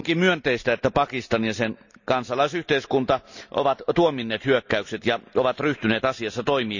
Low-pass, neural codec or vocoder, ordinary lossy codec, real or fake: 7.2 kHz; none; none; real